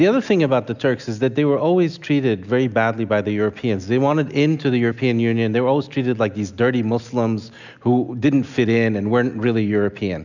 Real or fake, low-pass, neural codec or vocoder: real; 7.2 kHz; none